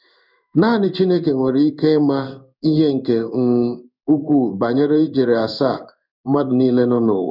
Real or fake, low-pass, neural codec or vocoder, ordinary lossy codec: fake; 5.4 kHz; codec, 16 kHz in and 24 kHz out, 1 kbps, XY-Tokenizer; none